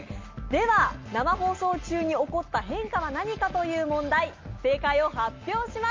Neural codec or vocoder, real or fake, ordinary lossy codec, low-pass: none; real; Opus, 24 kbps; 7.2 kHz